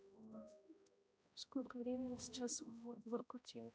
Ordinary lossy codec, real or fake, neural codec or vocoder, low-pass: none; fake; codec, 16 kHz, 0.5 kbps, X-Codec, HuBERT features, trained on balanced general audio; none